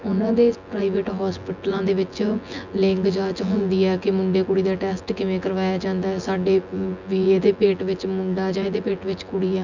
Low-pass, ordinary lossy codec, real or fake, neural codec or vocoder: 7.2 kHz; none; fake; vocoder, 24 kHz, 100 mel bands, Vocos